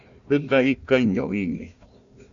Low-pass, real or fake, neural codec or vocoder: 7.2 kHz; fake; codec, 16 kHz, 1 kbps, FunCodec, trained on Chinese and English, 50 frames a second